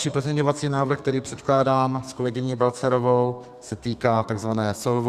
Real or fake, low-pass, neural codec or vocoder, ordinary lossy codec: fake; 14.4 kHz; codec, 32 kHz, 1.9 kbps, SNAC; Opus, 64 kbps